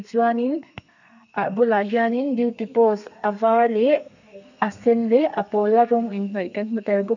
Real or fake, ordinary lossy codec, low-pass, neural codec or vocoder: fake; none; 7.2 kHz; codec, 32 kHz, 1.9 kbps, SNAC